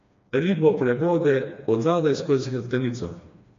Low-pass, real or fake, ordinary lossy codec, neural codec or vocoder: 7.2 kHz; fake; AAC, 48 kbps; codec, 16 kHz, 2 kbps, FreqCodec, smaller model